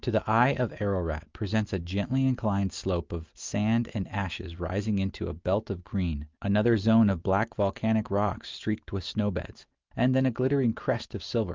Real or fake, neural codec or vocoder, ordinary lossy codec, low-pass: real; none; Opus, 24 kbps; 7.2 kHz